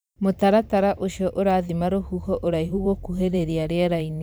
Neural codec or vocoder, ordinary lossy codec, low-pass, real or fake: vocoder, 44.1 kHz, 128 mel bands every 512 samples, BigVGAN v2; none; none; fake